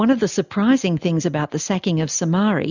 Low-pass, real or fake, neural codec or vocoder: 7.2 kHz; real; none